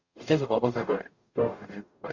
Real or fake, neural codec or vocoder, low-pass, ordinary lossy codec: fake; codec, 44.1 kHz, 0.9 kbps, DAC; 7.2 kHz; Opus, 64 kbps